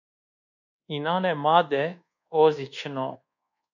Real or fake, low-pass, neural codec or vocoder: fake; 7.2 kHz; codec, 24 kHz, 1.2 kbps, DualCodec